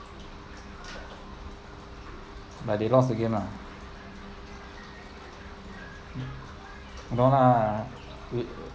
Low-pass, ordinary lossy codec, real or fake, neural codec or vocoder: none; none; real; none